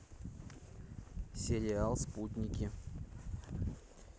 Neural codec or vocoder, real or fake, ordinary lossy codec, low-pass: none; real; none; none